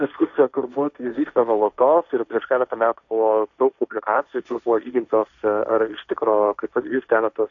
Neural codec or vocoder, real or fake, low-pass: codec, 16 kHz, 1.1 kbps, Voila-Tokenizer; fake; 7.2 kHz